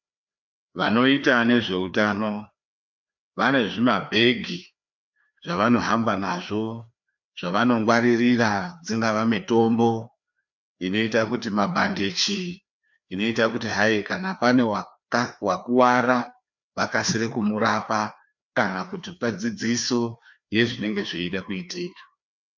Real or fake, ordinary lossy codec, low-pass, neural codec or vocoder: fake; MP3, 64 kbps; 7.2 kHz; codec, 16 kHz, 2 kbps, FreqCodec, larger model